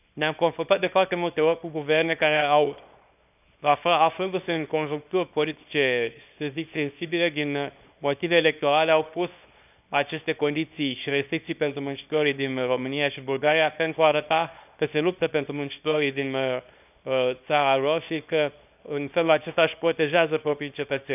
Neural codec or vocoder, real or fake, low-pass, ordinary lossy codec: codec, 24 kHz, 0.9 kbps, WavTokenizer, small release; fake; 3.6 kHz; none